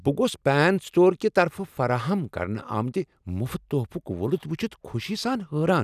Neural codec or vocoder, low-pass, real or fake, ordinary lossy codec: none; 14.4 kHz; real; none